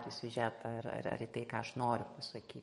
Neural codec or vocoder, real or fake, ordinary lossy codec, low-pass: codec, 44.1 kHz, 7.8 kbps, DAC; fake; MP3, 48 kbps; 19.8 kHz